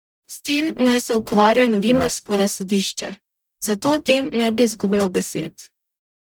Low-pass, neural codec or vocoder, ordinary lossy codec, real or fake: none; codec, 44.1 kHz, 0.9 kbps, DAC; none; fake